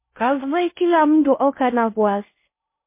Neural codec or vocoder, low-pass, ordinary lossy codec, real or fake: codec, 16 kHz in and 24 kHz out, 0.6 kbps, FocalCodec, streaming, 2048 codes; 3.6 kHz; MP3, 24 kbps; fake